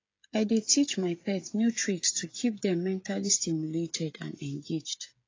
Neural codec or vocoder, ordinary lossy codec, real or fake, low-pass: codec, 16 kHz, 16 kbps, FreqCodec, smaller model; AAC, 32 kbps; fake; 7.2 kHz